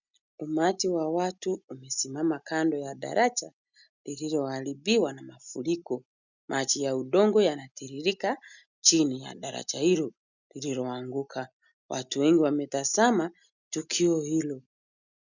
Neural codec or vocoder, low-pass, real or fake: none; 7.2 kHz; real